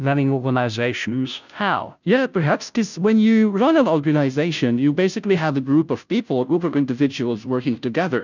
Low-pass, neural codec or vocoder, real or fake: 7.2 kHz; codec, 16 kHz, 0.5 kbps, FunCodec, trained on Chinese and English, 25 frames a second; fake